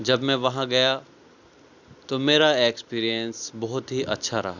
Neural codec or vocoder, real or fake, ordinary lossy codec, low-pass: none; real; Opus, 64 kbps; 7.2 kHz